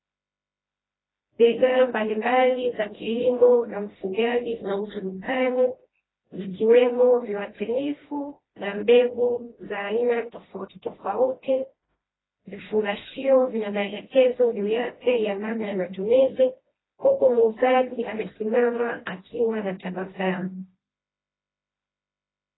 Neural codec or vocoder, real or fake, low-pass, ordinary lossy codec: codec, 16 kHz, 1 kbps, FreqCodec, smaller model; fake; 7.2 kHz; AAC, 16 kbps